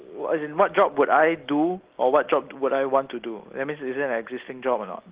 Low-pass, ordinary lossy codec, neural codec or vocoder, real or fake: 3.6 kHz; Opus, 16 kbps; none; real